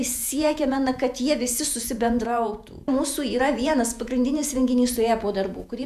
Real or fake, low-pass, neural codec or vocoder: fake; 14.4 kHz; vocoder, 48 kHz, 128 mel bands, Vocos